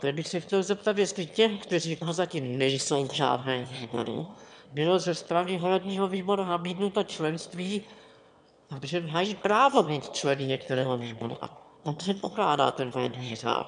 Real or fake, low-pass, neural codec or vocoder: fake; 9.9 kHz; autoencoder, 22.05 kHz, a latent of 192 numbers a frame, VITS, trained on one speaker